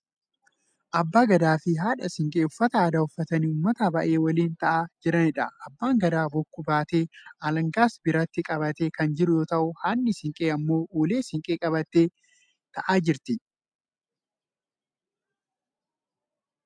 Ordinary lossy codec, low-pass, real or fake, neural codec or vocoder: MP3, 96 kbps; 9.9 kHz; real; none